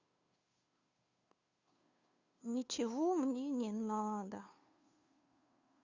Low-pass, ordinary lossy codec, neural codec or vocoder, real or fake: 7.2 kHz; Opus, 64 kbps; codec, 16 kHz, 2 kbps, FunCodec, trained on Chinese and English, 25 frames a second; fake